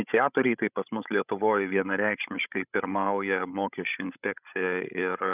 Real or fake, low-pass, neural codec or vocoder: fake; 3.6 kHz; codec, 16 kHz, 16 kbps, FreqCodec, larger model